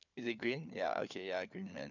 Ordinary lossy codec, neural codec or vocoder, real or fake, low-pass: none; codec, 16 kHz, 4 kbps, FunCodec, trained on LibriTTS, 50 frames a second; fake; 7.2 kHz